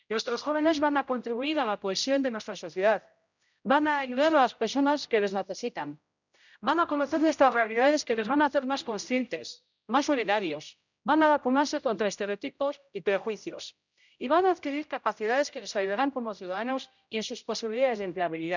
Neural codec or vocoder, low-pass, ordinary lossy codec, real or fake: codec, 16 kHz, 0.5 kbps, X-Codec, HuBERT features, trained on general audio; 7.2 kHz; none; fake